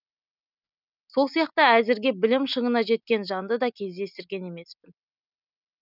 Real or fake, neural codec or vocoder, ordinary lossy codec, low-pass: real; none; none; 5.4 kHz